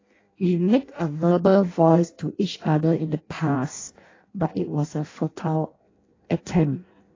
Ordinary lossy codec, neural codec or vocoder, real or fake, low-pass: AAC, 32 kbps; codec, 16 kHz in and 24 kHz out, 0.6 kbps, FireRedTTS-2 codec; fake; 7.2 kHz